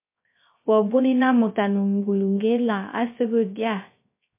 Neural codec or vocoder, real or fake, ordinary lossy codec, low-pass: codec, 16 kHz, 0.3 kbps, FocalCodec; fake; MP3, 24 kbps; 3.6 kHz